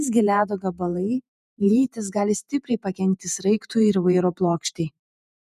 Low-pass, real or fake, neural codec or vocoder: 14.4 kHz; fake; vocoder, 48 kHz, 128 mel bands, Vocos